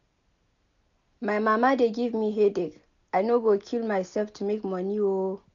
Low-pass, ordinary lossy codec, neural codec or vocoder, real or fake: 7.2 kHz; none; none; real